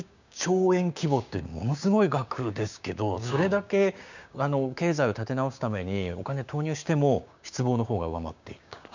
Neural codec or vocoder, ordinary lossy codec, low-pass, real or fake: codec, 16 kHz, 6 kbps, DAC; none; 7.2 kHz; fake